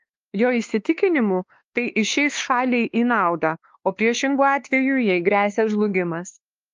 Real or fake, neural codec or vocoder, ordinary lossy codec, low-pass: fake; codec, 16 kHz, 2 kbps, X-Codec, WavLM features, trained on Multilingual LibriSpeech; Opus, 32 kbps; 7.2 kHz